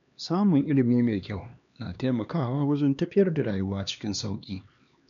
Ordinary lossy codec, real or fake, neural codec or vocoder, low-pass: none; fake; codec, 16 kHz, 2 kbps, X-Codec, HuBERT features, trained on LibriSpeech; 7.2 kHz